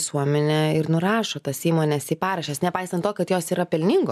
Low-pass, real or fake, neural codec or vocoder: 14.4 kHz; real; none